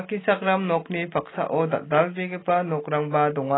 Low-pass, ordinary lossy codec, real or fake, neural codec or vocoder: 7.2 kHz; AAC, 16 kbps; real; none